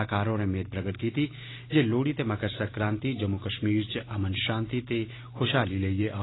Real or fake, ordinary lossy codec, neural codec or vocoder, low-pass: real; AAC, 16 kbps; none; 7.2 kHz